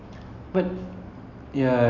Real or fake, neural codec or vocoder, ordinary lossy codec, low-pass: real; none; none; 7.2 kHz